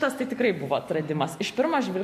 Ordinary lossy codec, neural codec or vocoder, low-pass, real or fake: MP3, 64 kbps; none; 14.4 kHz; real